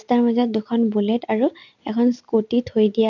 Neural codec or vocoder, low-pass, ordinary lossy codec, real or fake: none; 7.2 kHz; none; real